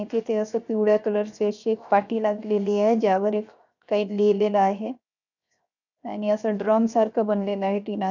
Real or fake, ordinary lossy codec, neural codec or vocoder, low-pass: fake; none; codec, 16 kHz, 0.7 kbps, FocalCodec; 7.2 kHz